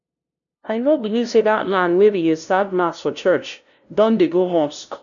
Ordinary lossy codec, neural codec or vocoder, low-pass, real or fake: none; codec, 16 kHz, 0.5 kbps, FunCodec, trained on LibriTTS, 25 frames a second; 7.2 kHz; fake